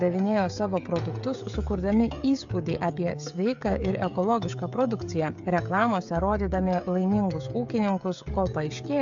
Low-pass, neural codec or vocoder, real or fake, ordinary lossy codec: 7.2 kHz; codec, 16 kHz, 16 kbps, FreqCodec, smaller model; fake; AAC, 64 kbps